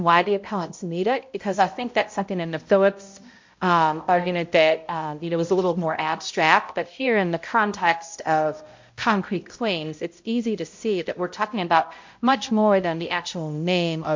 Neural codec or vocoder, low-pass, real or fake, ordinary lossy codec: codec, 16 kHz, 0.5 kbps, X-Codec, HuBERT features, trained on balanced general audio; 7.2 kHz; fake; MP3, 48 kbps